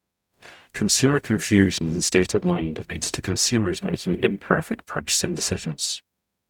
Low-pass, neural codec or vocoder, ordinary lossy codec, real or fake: 19.8 kHz; codec, 44.1 kHz, 0.9 kbps, DAC; none; fake